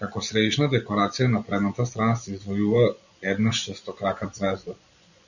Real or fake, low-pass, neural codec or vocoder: fake; 7.2 kHz; vocoder, 24 kHz, 100 mel bands, Vocos